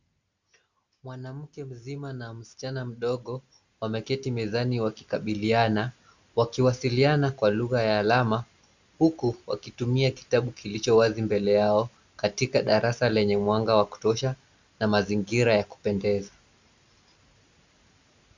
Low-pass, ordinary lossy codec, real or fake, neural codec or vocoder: 7.2 kHz; Opus, 64 kbps; real; none